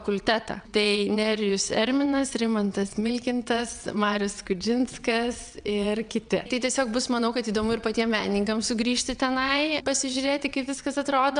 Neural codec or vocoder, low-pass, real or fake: vocoder, 22.05 kHz, 80 mel bands, WaveNeXt; 9.9 kHz; fake